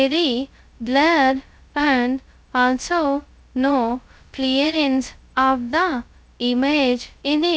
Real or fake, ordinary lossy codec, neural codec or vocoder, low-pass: fake; none; codec, 16 kHz, 0.2 kbps, FocalCodec; none